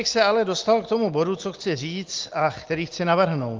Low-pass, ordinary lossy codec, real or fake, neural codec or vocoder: 7.2 kHz; Opus, 32 kbps; real; none